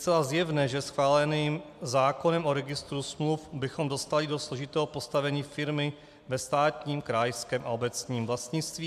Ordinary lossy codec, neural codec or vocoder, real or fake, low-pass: MP3, 96 kbps; none; real; 14.4 kHz